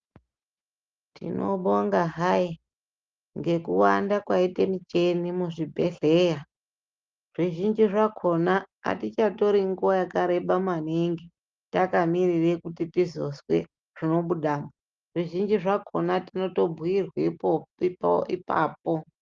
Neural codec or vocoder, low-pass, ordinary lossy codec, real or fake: none; 7.2 kHz; Opus, 32 kbps; real